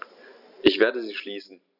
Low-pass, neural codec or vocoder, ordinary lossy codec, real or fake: 5.4 kHz; none; none; real